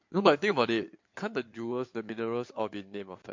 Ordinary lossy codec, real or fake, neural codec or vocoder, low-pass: MP3, 48 kbps; fake; codec, 16 kHz in and 24 kHz out, 2.2 kbps, FireRedTTS-2 codec; 7.2 kHz